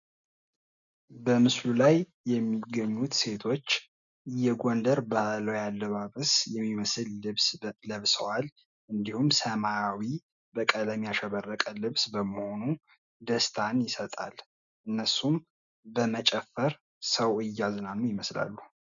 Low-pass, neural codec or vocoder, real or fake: 7.2 kHz; none; real